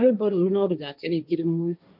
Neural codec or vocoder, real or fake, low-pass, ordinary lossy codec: codec, 16 kHz, 1.1 kbps, Voila-Tokenizer; fake; 5.4 kHz; none